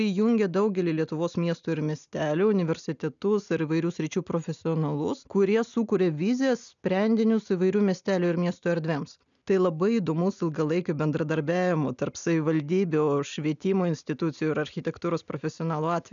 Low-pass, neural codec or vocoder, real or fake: 7.2 kHz; none; real